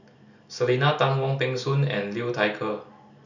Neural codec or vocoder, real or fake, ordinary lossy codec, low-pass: none; real; none; 7.2 kHz